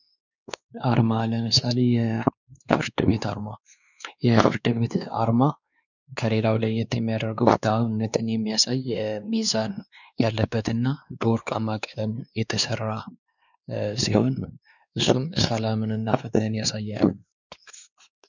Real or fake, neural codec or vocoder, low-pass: fake; codec, 16 kHz, 2 kbps, X-Codec, WavLM features, trained on Multilingual LibriSpeech; 7.2 kHz